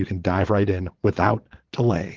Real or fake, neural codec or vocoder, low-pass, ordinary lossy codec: fake; vocoder, 22.05 kHz, 80 mel bands, Vocos; 7.2 kHz; Opus, 16 kbps